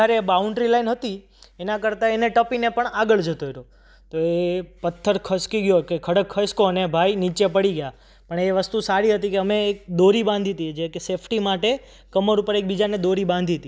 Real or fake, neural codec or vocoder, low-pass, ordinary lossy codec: real; none; none; none